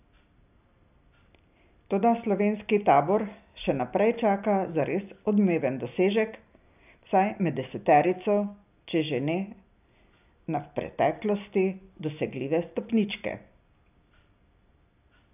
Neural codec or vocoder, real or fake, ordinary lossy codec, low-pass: none; real; none; 3.6 kHz